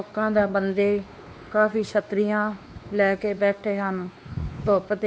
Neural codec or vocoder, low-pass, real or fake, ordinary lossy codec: codec, 16 kHz, 2 kbps, X-Codec, WavLM features, trained on Multilingual LibriSpeech; none; fake; none